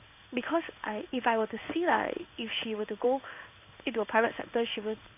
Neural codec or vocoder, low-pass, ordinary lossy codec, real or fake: codec, 16 kHz in and 24 kHz out, 1 kbps, XY-Tokenizer; 3.6 kHz; AAC, 32 kbps; fake